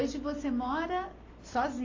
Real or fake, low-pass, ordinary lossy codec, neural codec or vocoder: real; 7.2 kHz; AAC, 32 kbps; none